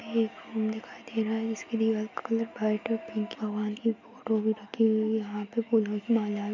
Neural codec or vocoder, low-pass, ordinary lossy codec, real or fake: none; 7.2 kHz; none; real